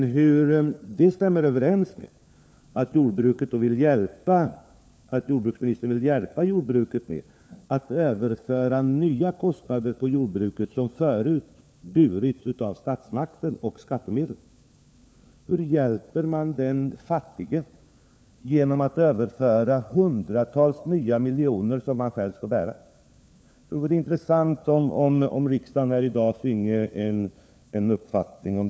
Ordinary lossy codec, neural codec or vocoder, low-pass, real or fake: none; codec, 16 kHz, 4 kbps, FunCodec, trained on LibriTTS, 50 frames a second; none; fake